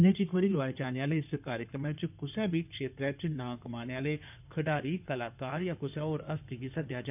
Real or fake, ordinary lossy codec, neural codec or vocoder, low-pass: fake; none; codec, 16 kHz in and 24 kHz out, 2.2 kbps, FireRedTTS-2 codec; 3.6 kHz